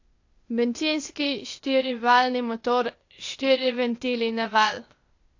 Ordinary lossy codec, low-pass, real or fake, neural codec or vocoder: AAC, 48 kbps; 7.2 kHz; fake; codec, 16 kHz, 0.8 kbps, ZipCodec